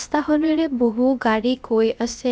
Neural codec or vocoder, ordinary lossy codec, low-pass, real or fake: codec, 16 kHz, about 1 kbps, DyCAST, with the encoder's durations; none; none; fake